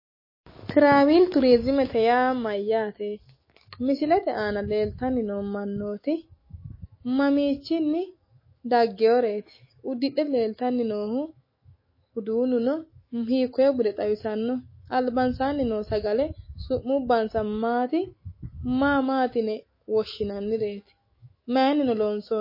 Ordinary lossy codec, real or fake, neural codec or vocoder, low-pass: MP3, 24 kbps; fake; autoencoder, 48 kHz, 128 numbers a frame, DAC-VAE, trained on Japanese speech; 5.4 kHz